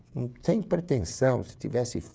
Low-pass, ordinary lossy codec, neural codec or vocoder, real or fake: none; none; codec, 16 kHz, 16 kbps, FreqCodec, smaller model; fake